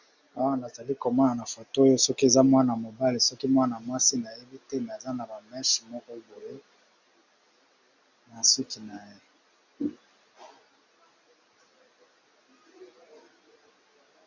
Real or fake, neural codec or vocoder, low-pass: real; none; 7.2 kHz